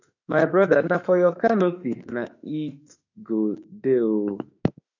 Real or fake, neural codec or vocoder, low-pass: fake; autoencoder, 48 kHz, 32 numbers a frame, DAC-VAE, trained on Japanese speech; 7.2 kHz